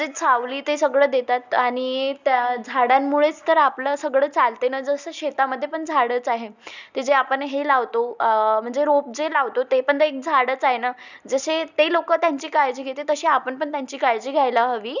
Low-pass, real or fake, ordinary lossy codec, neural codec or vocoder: 7.2 kHz; real; none; none